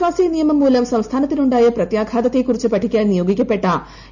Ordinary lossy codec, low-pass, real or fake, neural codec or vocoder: none; 7.2 kHz; real; none